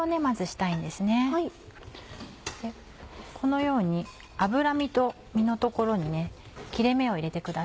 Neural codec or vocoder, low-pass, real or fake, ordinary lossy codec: none; none; real; none